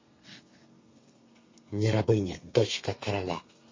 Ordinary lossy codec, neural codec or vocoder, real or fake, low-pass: MP3, 32 kbps; codec, 32 kHz, 1.9 kbps, SNAC; fake; 7.2 kHz